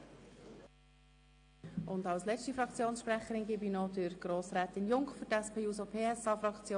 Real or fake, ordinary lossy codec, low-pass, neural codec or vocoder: real; AAC, 48 kbps; 9.9 kHz; none